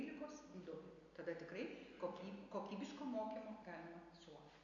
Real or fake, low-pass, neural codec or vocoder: real; 7.2 kHz; none